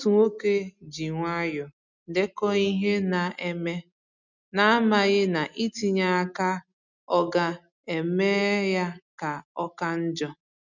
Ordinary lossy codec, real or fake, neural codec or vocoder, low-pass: none; real; none; 7.2 kHz